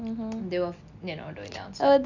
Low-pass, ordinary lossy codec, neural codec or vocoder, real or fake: 7.2 kHz; none; none; real